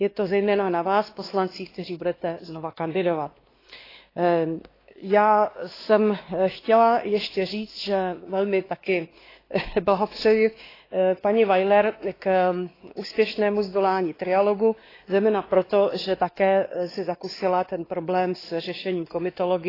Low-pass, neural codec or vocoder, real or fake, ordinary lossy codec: 5.4 kHz; codec, 16 kHz, 2 kbps, X-Codec, WavLM features, trained on Multilingual LibriSpeech; fake; AAC, 24 kbps